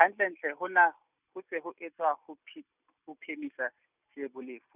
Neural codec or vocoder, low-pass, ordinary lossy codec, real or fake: none; 3.6 kHz; none; real